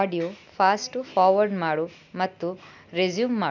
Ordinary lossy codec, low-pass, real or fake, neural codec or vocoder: none; 7.2 kHz; real; none